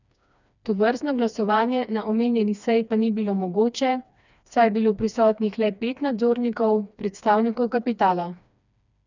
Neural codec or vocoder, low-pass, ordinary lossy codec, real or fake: codec, 16 kHz, 2 kbps, FreqCodec, smaller model; 7.2 kHz; none; fake